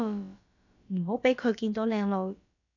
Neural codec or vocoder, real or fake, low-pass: codec, 16 kHz, about 1 kbps, DyCAST, with the encoder's durations; fake; 7.2 kHz